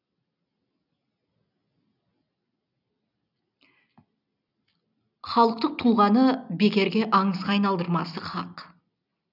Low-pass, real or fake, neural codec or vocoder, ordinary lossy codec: 5.4 kHz; real; none; none